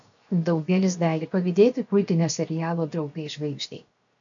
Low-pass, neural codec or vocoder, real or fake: 7.2 kHz; codec, 16 kHz, 0.7 kbps, FocalCodec; fake